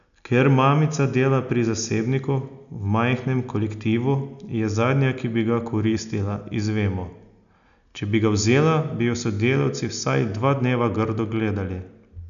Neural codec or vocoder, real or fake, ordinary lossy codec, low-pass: none; real; none; 7.2 kHz